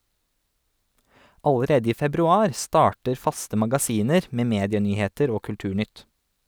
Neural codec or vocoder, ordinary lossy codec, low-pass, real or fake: none; none; none; real